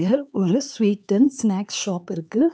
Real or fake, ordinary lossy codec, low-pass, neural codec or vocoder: fake; none; none; codec, 16 kHz, 4 kbps, X-Codec, HuBERT features, trained on LibriSpeech